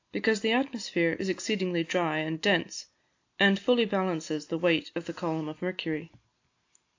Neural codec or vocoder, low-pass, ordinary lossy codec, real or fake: none; 7.2 kHz; AAC, 48 kbps; real